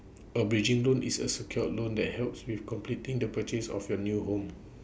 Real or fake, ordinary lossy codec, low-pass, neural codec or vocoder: real; none; none; none